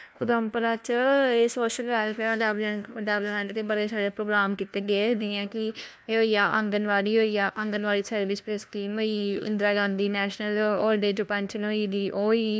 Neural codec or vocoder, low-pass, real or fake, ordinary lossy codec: codec, 16 kHz, 1 kbps, FunCodec, trained on LibriTTS, 50 frames a second; none; fake; none